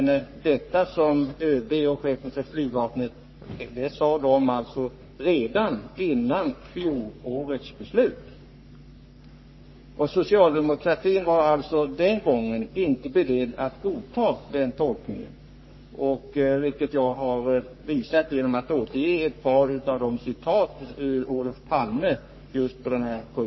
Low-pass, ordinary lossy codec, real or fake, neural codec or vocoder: 7.2 kHz; MP3, 24 kbps; fake; codec, 44.1 kHz, 3.4 kbps, Pupu-Codec